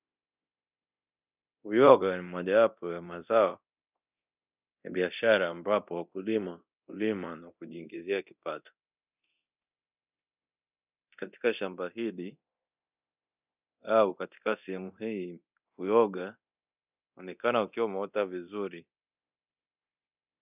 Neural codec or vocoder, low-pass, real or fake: codec, 24 kHz, 0.9 kbps, DualCodec; 3.6 kHz; fake